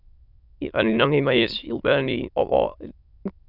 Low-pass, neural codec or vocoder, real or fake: 5.4 kHz; autoencoder, 22.05 kHz, a latent of 192 numbers a frame, VITS, trained on many speakers; fake